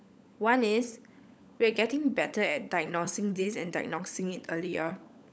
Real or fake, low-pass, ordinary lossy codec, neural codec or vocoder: fake; none; none; codec, 16 kHz, 16 kbps, FunCodec, trained on LibriTTS, 50 frames a second